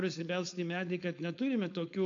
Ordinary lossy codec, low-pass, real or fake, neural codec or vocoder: AAC, 48 kbps; 7.2 kHz; fake; codec, 16 kHz, 4.8 kbps, FACodec